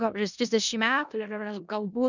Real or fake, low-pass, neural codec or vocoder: fake; 7.2 kHz; codec, 16 kHz in and 24 kHz out, 0.4 kbps, LongCat-Audio-Codec, four codebook decoder